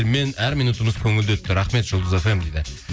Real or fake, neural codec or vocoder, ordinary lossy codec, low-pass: real; none; none; none